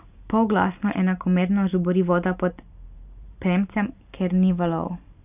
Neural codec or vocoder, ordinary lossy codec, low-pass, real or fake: vocoder, 44.1 kHz, 128 mel bands every 512 samples, BigVGAN v2; none; 3.6 kHz; fake